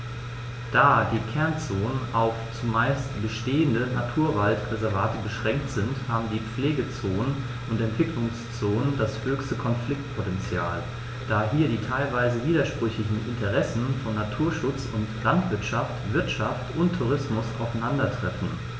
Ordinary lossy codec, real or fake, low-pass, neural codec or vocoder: none; real; none; none